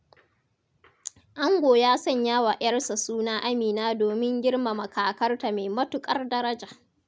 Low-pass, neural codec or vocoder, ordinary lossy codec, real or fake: none; none; none; real